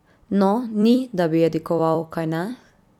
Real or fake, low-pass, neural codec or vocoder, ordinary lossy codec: fake; 19.8 kHz; vocoder, 44.1 kHz, 128 mel bands every 256 samples, BigVGAN v2; none